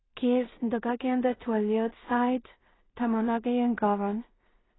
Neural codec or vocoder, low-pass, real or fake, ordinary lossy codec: codec, 16 kHz in and 24 kHz out, 0.4 kbps, LongCat-Audio-Codec, two codebook decoder; 7.2 kHz; fake; AAC, 16 kbps